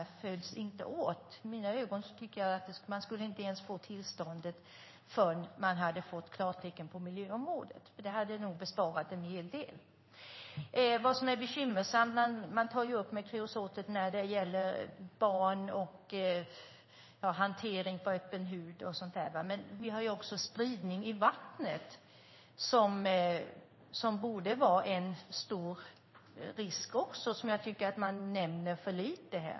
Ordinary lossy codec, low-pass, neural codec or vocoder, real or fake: MP3, 24 kbps; 7.2 kHz; codec, 16 kHz in and 24 kHz out, 1 kbps, XY-Tokenizer; fake